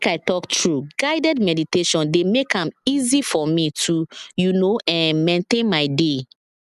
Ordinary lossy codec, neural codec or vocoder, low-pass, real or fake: none; none; 14.4 kHz; real